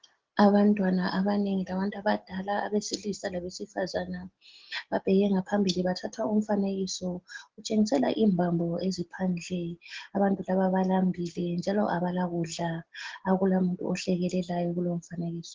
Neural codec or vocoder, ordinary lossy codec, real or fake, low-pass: none; Opus, 16 kbps; real; 7.2 kHz